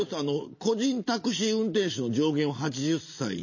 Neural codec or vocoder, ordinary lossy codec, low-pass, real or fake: none; MP3, 32 kbps; 7.2 kHz; real